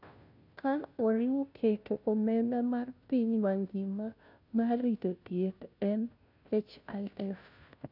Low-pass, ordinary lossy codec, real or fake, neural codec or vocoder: 5.4 kHz; none; fake; codec, 16 kHz, 0.5 kbps, FunCodec, trained on Chinese and English, 25 frames a second